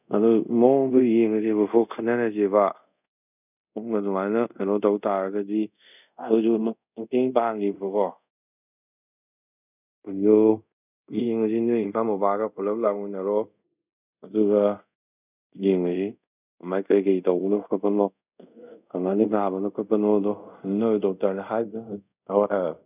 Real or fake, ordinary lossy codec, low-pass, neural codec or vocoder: fake; none; 3.6 kHz; codec, 24 kHz, 0.5 kbps, DualCodec